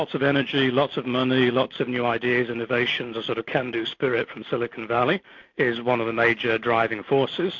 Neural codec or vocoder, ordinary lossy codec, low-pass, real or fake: none; MP3, 48 kbps; 7.2 kHz; real